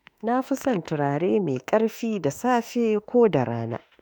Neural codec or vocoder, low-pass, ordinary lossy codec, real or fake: autoencoder, 48 kHz, 32 numbers a frame, DAC-VAE, trained on Japanese speech; none; none; fake